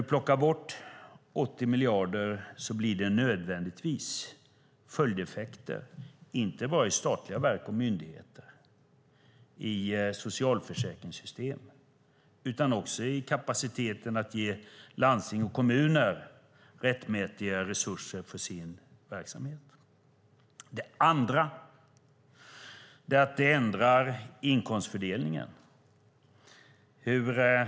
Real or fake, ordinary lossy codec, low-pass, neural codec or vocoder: real; none; none; none